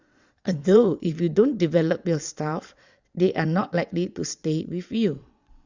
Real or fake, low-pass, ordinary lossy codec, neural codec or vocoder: fake; 7.2 kHz; Opus, 64 kbps; vocoder, 22.05 kHz, 80 mel bands, WaveNeXt